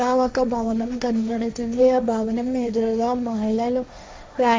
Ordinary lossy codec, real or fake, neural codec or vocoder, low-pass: none; fake; codec, 16 kHz, 1.1 kbps, Voila-Tokenizer; none